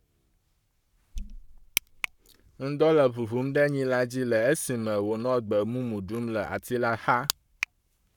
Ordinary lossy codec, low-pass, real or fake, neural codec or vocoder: none; 19.8 kHz; fake; codec, 44.1 kHz, 7.8 kbps, Pupu-Codec